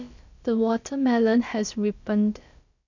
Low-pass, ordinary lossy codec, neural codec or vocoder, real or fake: 7.2 kHz; none; codec, 16 kHz, about 1 kbps, DyCAST, with the encoder's durations; fake